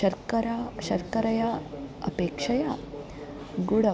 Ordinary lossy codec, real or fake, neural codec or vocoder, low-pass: none; real; none; none